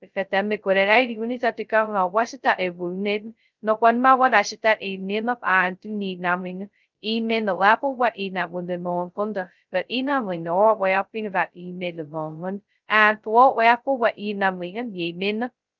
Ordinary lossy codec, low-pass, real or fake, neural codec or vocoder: Opus, 32 kbps; 7.2 kHz; fake; codec, 16 kHz, 0.2 kbps, FocalCodec